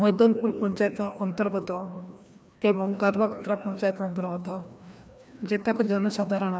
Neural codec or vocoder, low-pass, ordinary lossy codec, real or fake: codec, 16 kHz, 1 kbps, FreqCodec, larger model; none; none; fake